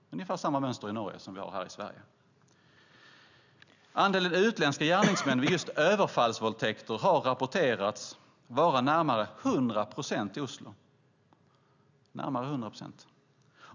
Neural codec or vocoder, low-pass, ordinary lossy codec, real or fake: none; 7.2 kHz; none; real